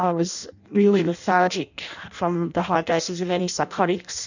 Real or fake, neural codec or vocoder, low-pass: fake; codec, 16 kHz in and 24 kHz out, 0.6 kbps, FireRedTTS-2 codec; 7.2 kHz